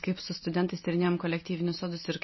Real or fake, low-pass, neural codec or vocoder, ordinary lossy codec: real; 7.2 kHz; none; MP3, 24 kbps